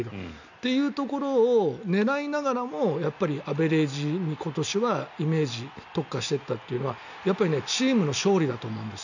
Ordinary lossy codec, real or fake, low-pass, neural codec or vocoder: none; real; 7.2 kHz; none